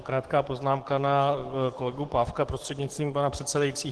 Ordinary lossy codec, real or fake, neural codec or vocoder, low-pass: Opus, 16 kbps; fake; codec, 44.1 kHz, 7.8 kbps, DAC; 10.8 kHz